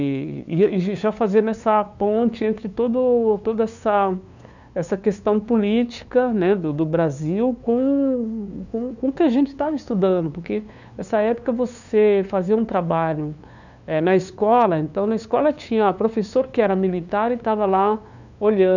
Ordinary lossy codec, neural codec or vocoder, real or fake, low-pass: none; codec, 16 kHz, 2 kbps, FunCodec, trained on LibriTTS, 25 frames a second; fake; 7.2 kHz